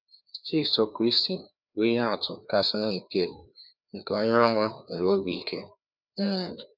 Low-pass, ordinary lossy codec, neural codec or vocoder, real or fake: 5.4 kHz; none; codec, 16 kHz, 2 kbps, FreqCodec, larger model; fake